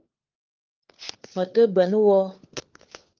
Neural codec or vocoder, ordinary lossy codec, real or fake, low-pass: codec, 16 kHz, 4 kbps, FunCodec, trained on LibriTTS, 50 frames a second; Opus, 32 kbps; fake; 7.2 kHz